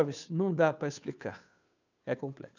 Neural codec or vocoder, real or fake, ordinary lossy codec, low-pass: codec, 16 kHz, 2 kbps, FunCodec, trained on Chinese and English, 25 frames a second; fake; none; 7.2 kHz